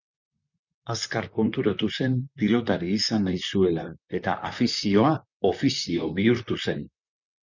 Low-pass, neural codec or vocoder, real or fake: 7.2 kHz; vocoder, 44.1 kHz, 128 mel bands, Pupu-Vocoder; fake